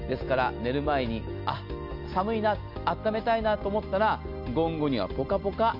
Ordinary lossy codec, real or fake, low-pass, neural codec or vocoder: MP3, 48 kbps; real; 5.4 kHz; none